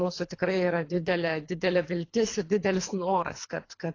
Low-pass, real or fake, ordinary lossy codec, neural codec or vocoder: 7.2 kHz; fake; AAC, 32 kbps; codec, 24 kHz, 3 kbps, HILCodec